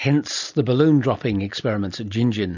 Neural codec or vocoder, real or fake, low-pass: vocoder, 44.1 kHz, 128 mel bands every 256 samples, BigVGAN v2; fake; 7.2 kHz